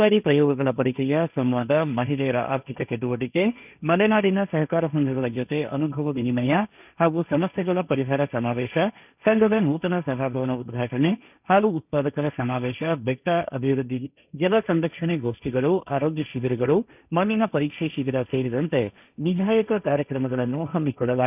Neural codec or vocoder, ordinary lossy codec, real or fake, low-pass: codec, 16 kHz, 1.1 kbps, Voila-Tokenizer; none; fake; 3.6 kHz